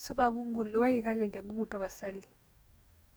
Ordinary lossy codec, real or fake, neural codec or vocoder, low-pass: none; fake; codec, 44.1 kHz, 2.6 kbps, DAC; none